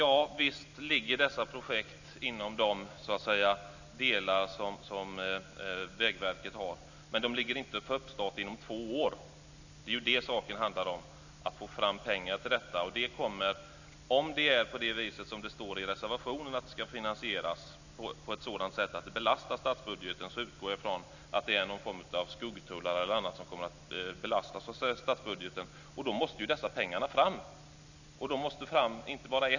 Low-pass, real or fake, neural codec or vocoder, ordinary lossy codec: 7.2 kHz; real; none; none